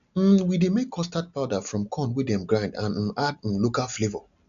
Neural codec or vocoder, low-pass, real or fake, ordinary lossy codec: none; 7.2 kHz; real; none